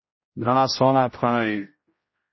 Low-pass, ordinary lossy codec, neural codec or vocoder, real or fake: 7.2 kHz; MP3, 24 kbps; codec, 16 kHz, 0.5 kbps, X-Codec, HuBERT features, trained on general audio; fake